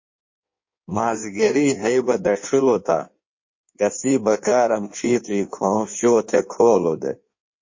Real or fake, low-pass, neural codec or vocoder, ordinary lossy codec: fake; 7.2 kHz; codec, 16 kHz in and 24 kHz out, 1.1 kbps, FireRedTTS-2 codec; MP3, 32 kbps